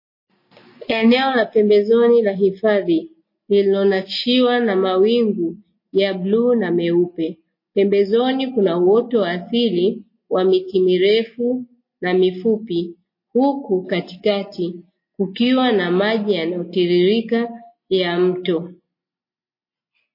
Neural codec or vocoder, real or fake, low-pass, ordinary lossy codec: none; real; 5.4 kHz; MP3, 24 kbps